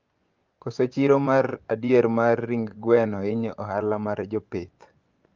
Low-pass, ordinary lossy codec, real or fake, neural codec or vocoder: 7.2 kHz; Opus, 24 kbps; fake; vocoder, 24 kHz, 100 mel bands, Vocos